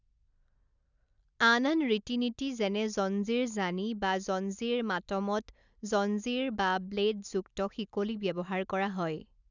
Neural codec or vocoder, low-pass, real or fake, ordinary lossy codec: none; 7.2 kHz; real; none